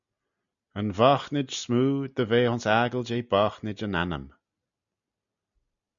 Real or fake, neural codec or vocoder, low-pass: real; none; 7.2 kHz